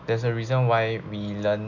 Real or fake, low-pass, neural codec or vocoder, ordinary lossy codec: real; 7.2 kHz; none; none